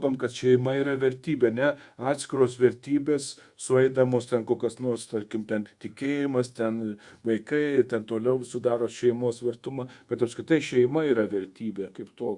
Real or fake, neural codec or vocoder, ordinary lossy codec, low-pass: fake; codec, 24 kHz, 1.2 kbps, DualCodec; Opus, 64 kbps; 10.8 kHz